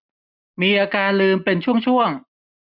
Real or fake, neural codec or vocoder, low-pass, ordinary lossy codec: real; none; 5.4 kHz; none